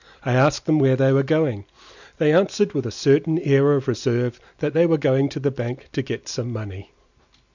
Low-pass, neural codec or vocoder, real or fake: 7.2 kHz; none; real